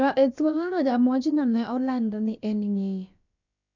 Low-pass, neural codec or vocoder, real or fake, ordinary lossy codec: 7.2 kHz; codec, 16 kHz, about 1 kbps, DyCAST, with the encoder's durations; fake; none